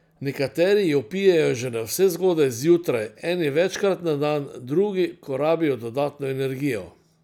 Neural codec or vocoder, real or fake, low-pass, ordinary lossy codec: none; real; 19.8 kHz; none